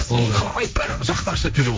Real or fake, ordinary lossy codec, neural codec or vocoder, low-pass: fake; none; codec, 16 kHz, 1.1 kbps, Voila-Tokenizer; none